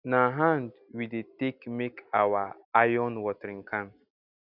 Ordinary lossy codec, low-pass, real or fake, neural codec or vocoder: none; 5.4 kHz; real; none